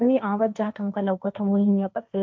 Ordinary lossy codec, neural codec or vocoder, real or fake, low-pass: none; codec, 16 kHz, 1.1 kbps, Voila-Tokenizer; fake; none